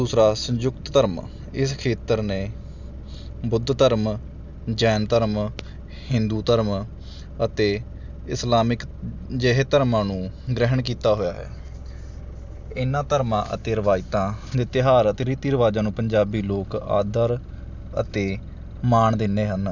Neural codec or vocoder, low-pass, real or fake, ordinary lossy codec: none; 7.2 kHz; real; none